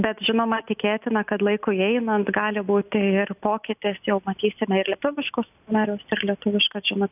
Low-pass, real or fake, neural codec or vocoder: 3.6 kHz; real; none